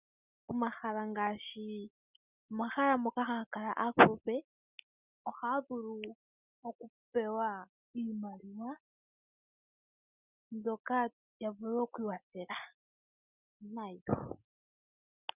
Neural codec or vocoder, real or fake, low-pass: none; real; 3.6 kHz